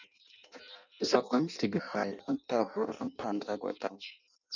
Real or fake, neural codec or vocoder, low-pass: fake; codec, 16 kHz in and 24 kHz out, 0.6 kbps, FireRedTTS-2 codec; 7.2 kHz